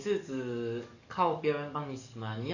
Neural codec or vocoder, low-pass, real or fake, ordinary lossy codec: codec, 44.1 kHz, 7.8 kbps, DAC; 7.2 kHz; fake; none